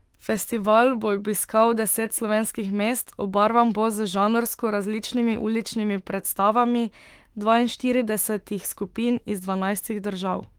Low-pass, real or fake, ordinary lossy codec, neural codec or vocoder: 19.8 kHz; fake; Opus, 24 kbps; autoencoder, 48 kHz, 32 numbers a frame, DAC-VAE, trained on Japanese speech